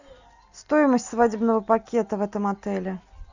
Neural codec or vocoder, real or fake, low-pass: none; real; 7.2 kHz